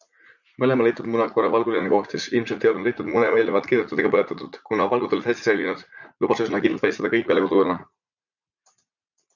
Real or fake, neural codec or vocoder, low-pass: fake; vocoder, 44.1 kHz, 80 mel bands, Vocos; 7.2 kHz